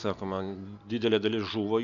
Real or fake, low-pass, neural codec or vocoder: real; 7.2 kHz; none